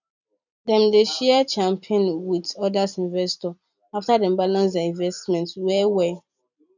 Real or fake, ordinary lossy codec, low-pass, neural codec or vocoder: fake; none; 7.2 kHz; vocoder, 44.1 kHz, 128 mel bands every 256 samples, BigVGAN v2